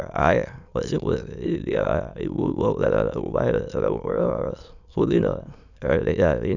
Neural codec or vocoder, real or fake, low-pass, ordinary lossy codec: autoencoder, 22.05 kHz, a latent of 192 numbers a frame, VITS, trained on many speakers; fake; 7.2 kHz; none